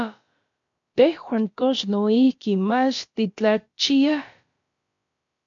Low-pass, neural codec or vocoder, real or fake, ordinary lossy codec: 7.2 kHz; codec, 16 kHz, about 1 kbps, DyCAST, with the encoder's durations; fake; MP3, 48 kbps